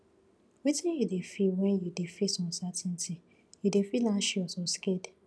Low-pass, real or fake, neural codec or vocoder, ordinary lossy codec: none; real; none; none